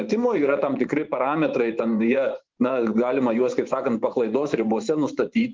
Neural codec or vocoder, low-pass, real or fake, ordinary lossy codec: vocoder, 24 kHz, 100 mel bands, Vocos; 7.2 kHz; fake; Opus, 32 kbps